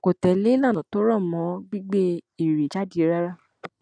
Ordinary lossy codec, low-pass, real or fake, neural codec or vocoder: none; 9.9 kHz; real; none